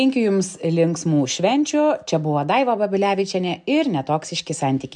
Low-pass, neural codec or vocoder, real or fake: 10.8 kHz; none; real